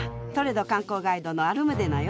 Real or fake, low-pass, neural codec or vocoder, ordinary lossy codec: real; none; none; none